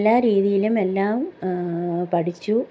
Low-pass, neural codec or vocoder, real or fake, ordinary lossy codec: none; none; real; none